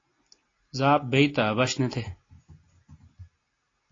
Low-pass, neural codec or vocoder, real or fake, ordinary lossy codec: 7.2 kHz; none; real; AAC, 32 kbps